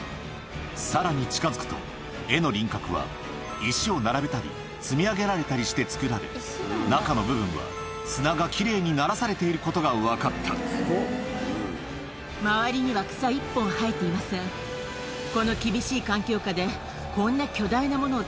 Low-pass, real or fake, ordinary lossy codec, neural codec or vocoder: none; real; none; none